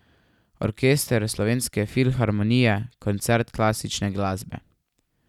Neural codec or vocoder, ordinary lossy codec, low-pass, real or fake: none; none; 19.8 kHz; real